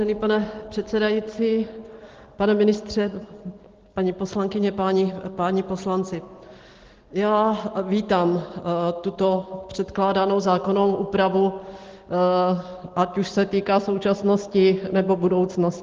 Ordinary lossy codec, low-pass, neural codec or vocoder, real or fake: Opus, 16 kbps; 7.2 kHz; none; real